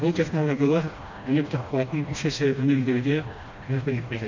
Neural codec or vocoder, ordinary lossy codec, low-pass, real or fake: codec, 16 kHz, 1 kbps, FreqCodec, smaller model; MP3, 48 kbps; 7.2 kHz; fake